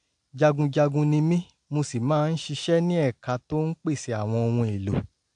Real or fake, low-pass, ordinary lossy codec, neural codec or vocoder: real; 9.9 kHz; AAC, 64 kbps; none